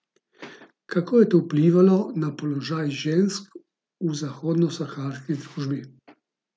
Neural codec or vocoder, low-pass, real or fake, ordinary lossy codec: none; none; real; none